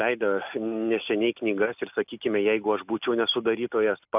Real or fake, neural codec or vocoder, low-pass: real; none; 3.6 kHz